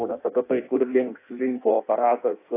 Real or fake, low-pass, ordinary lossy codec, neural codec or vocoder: fake; 3.6 kHz; AAC, 24 kbps; codec, 16 kHz in and 24 kHz out, 0.6 kbps, FireRedTTS-2 codec